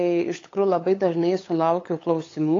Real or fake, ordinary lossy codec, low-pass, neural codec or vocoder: fake; AAC, 32 kbps; 7.2 kHz; codec, 16 kHz, 8 kbps, FunCodec, trained on LibriTTS, 25 frames a second